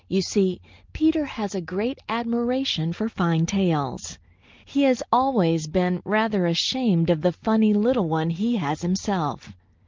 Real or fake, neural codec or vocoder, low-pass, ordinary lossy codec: real; none; 7.2 kHz; Opus, 24 kbps